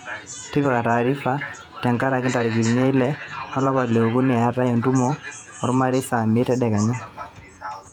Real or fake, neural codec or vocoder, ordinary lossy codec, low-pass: fake; vocoder, 48 kHz, 128 mel bands, Vocos; none; 19.8 kHz